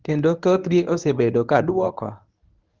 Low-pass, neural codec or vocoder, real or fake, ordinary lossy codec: 7.2 kHz; codec, 24 kHz, 0.9 kbps, WavTokenizer, medium speech release version 1; fake; Opus, 32 kbps